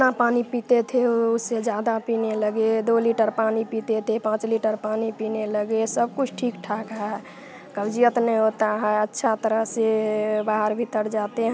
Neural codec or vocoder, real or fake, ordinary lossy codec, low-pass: none; real; none; none